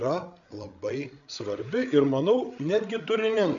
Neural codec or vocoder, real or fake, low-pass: codec, 16 kHz, 8 kbps, FreqCodec, larger model; fake; 7.2 kHz